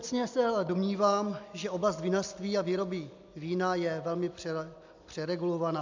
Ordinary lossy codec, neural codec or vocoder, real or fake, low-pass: MP3, 64 kbps; none; real; 7.2 kHz